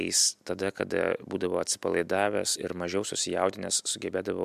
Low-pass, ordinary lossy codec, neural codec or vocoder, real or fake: 14.4 kHz; MP3, 96 kbps; none; real